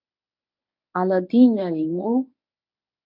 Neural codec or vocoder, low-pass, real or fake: codec, 24 kHz, 0.9 kbps, WavTokenizer, medium speech release version 1; 5.4 kHz; fake